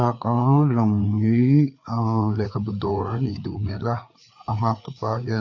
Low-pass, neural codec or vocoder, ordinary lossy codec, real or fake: 7.2 kHz; codec, 16 kHz, 4 kbps, FreqCodec, larger model; AAC, 48 kbps; fake